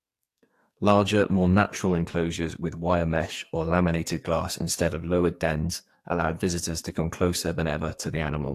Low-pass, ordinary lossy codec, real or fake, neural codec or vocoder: 14.4 kHz; AAC, 64 kbps; fake; codec, 44.1 kHz, 2.6 kbps, SNAC